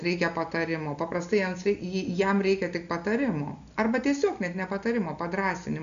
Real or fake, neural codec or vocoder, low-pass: real; none; 7.2 kHz